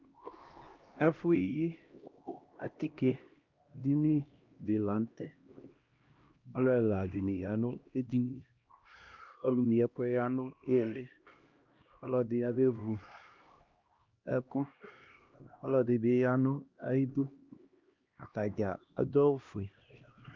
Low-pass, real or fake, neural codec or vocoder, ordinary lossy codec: 7.2 kHz; fake; codec, 16 kHz, 1 kbps, X-Codec, HuBERT features, trained on LibriSpeech; Opus, 24 kbps